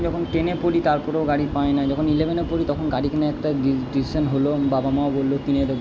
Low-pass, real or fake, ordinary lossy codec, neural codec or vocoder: none; real; none; none